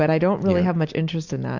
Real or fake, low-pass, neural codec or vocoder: real; 7.2 kHz; none